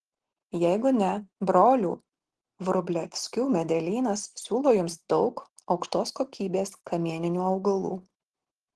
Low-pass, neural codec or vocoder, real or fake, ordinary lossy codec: 10.8 kHz; none; real; Opus, 16 kbps